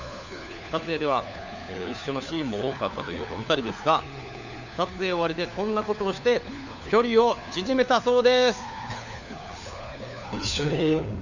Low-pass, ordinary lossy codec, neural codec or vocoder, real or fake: 7.2 kHz; none; codec, 16 kHz, 4 kbps, FunCodec, trained on LibriTTS, 50 frames a second; fake